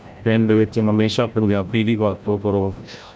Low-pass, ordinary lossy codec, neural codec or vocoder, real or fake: none; none; codec, 16 kHz, 0.5 kbps, FreqCodec, larger model; fake